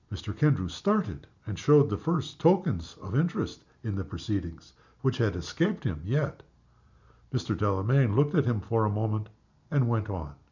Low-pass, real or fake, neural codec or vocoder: 7.2 kHz; real; none